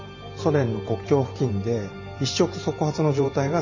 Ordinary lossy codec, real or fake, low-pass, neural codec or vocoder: none; fake; 7.2 kHz; vocoder, 44.1 kHz, 128 mel bands every 512 samples, BigVGAN v2